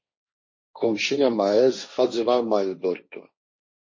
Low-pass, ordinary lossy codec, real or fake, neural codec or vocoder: 7.2 kHz; MP3, 32 kbps; fake; codec, 16 kHz, 1.1 kbps, Voila-Tokenizer